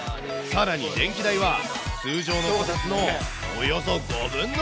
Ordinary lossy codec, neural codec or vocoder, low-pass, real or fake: none; none; none; real